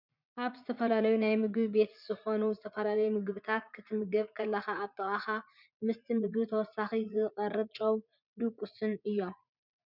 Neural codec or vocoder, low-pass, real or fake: vocoder, 44.1 kHz, 80 mel bands, Vocos; 5.4 kHz; fake